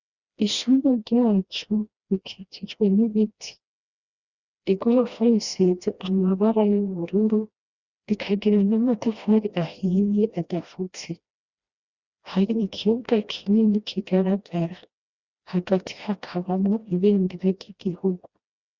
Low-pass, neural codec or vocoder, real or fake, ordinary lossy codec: 7.2 kHz; codec, 16 kHz, 1 kbps, FreqCodec, smaller model; fake; Opus, 64 kbps